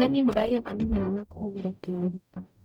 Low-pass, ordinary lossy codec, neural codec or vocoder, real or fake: 19.8 kHz; none; codec, 44.1 kHz, 0.9 kbps, DAC; fake